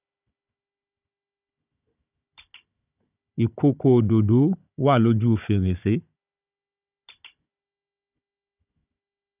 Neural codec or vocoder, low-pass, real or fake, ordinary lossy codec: codec, 16 kHz, 16 kbps, FunCodec, trained on Chinese and English, 50 frames a second; 3.6 kHz; fake; none